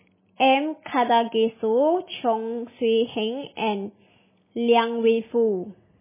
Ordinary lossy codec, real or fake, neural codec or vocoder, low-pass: MP3, 16 kbps; real; none; 3.6 kHz